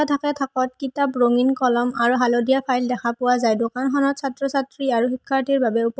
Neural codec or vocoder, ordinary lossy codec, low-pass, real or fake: none; none; none; real